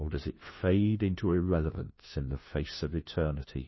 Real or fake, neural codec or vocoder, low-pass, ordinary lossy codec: fake; codec, 16 kHz, 1 kbps, FunCodec, trained on LibriTTS, 50 frames a second; 7.2 kHz; MP3, 24 kbps